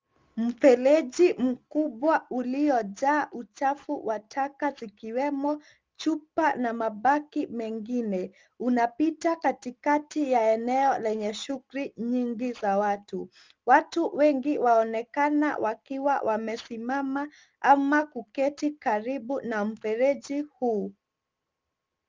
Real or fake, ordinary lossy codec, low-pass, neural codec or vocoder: real; Opus, 32 kbps; 7.2 kHz; none